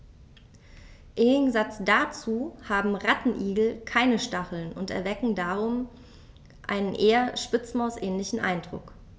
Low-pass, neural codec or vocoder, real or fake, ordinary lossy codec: none; none; real; none